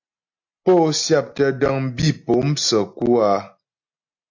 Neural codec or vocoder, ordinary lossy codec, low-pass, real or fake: none; AAC, 48 kbps; 7.2 kHz; real